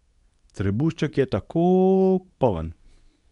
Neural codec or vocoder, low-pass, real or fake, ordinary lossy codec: codec, 24 kHz, 0.9 kbps, WavTokenizer, medium speech release version 2; 10.8 kHz; fake; none